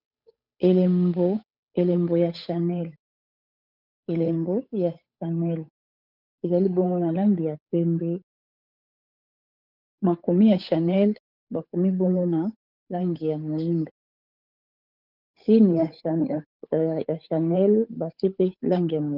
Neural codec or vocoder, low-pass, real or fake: codec, 16 kHz, 8 kbps, FunCodec, trained on Chinese and English, 25 frames a second; 5.4 kHz; fake